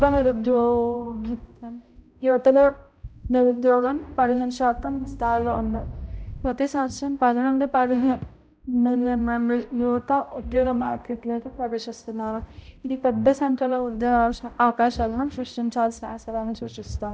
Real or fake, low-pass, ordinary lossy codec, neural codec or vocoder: fake; none; none; codec, 16 kHz, 0.5 kbps, X-Codec, HuBERT features, trained on balanced general audio